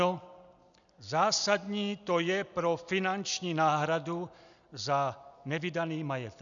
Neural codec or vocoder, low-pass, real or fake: none; 7.2 kHz; real